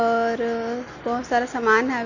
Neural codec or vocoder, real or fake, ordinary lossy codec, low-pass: none; real; none; 7.2 kHz